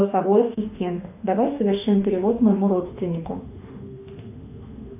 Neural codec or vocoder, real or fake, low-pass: autoencoder, 48 kHz, 32 numbers a frame, DAC-VAE, trained on Japanese speech; fake; 3.6 kHz